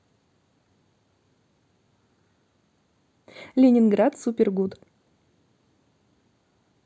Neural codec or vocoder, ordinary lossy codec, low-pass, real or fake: none; none; none; real